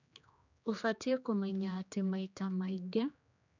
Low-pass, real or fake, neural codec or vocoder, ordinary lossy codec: 7.2 kHz; fake; codec, 16 kHz, 2 kbps, X-Codec, HuBERT features, trained on general audio; none